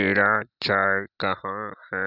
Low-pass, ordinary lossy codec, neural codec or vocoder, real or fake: 5.4 kHz; none; none; real